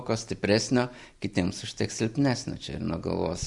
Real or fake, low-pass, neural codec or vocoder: real; 10.8 kHz; none